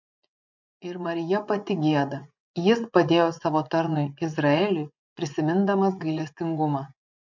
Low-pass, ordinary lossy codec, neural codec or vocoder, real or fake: 7.2 kHz; MP3, 64 kbps; vocoder, 24 kHz, 100 mel bands, Vocos; fake